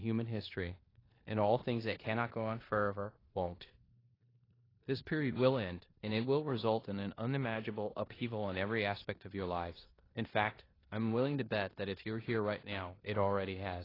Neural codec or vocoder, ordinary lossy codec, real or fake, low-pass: codec, 16 kHz in and 24 kHz out, 0.9 kbps, LongCat-Audio-Codec, four codebook decoder; AAC, 24 kbps; fake; 5.4 kHz